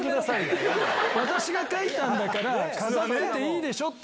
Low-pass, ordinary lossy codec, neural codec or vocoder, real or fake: none; none; none; real